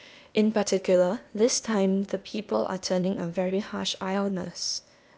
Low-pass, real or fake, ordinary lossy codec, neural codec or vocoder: none; fake; none; codec, 16 kHz, 0.8 kbps, ZipCodec